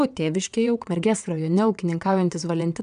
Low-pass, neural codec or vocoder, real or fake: 9.9 kHz; vocoder, 22.05 kHz, 80 mel bands, WaveNeXt; fake